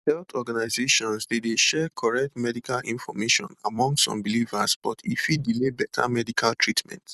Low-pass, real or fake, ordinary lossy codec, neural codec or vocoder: 14.4 kHz; fake; none; vocoder, 44.1 kHz, 128 mel bands every 256 samples, BigVGAN v2